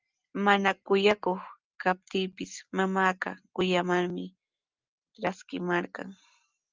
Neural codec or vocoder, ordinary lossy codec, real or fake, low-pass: none; Opus, 24 kbps; real; 7.2 kHz